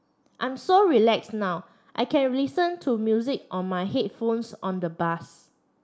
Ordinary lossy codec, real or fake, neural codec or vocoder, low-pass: none; real; none; none